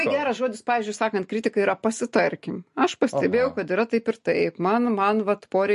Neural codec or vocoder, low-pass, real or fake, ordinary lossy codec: none; 14.4 kHz; real; MP3, 48 kbps